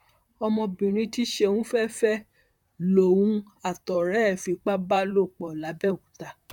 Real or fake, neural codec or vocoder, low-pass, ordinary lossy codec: fake; vocoder, 44.1 kHz, 128 mel bands, Pupu-Vocoder; 19.8 kHz; none